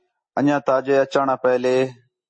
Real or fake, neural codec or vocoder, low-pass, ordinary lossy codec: real; none; 9.9 kHz; MP3, 32 kbps